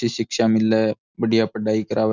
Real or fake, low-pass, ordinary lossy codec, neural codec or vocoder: real; 7.2 kHz; none; none